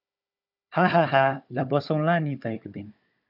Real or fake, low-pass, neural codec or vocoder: fake; 5.4 kHz; codec, 16 kHz, 4 kbps, FunCodec, trained on Chinese and English, 50 frames a second